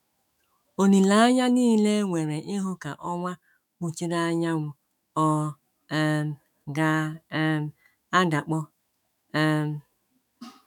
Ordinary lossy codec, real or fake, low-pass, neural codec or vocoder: none; fake; 19.8 kHz; autoencoder, 48 kHz, 128 numbers a frame, DAC-VAE, trained on Japanese speech